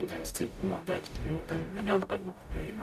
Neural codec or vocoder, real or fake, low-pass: codec, 44.1 kHz, 0.9 kbps, DAC; fake; 14.4 kHz